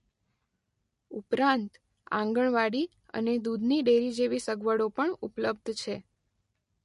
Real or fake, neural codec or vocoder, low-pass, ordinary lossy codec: real; none; 14.4 kHz; MP3, 48 kbps